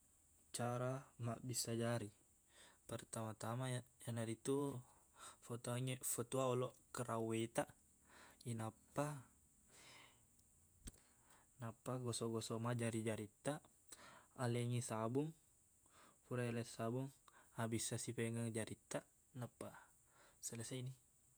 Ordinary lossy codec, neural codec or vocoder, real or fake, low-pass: none; vocoder, 48 kHz, 128 mel bands, Vocos; fake; none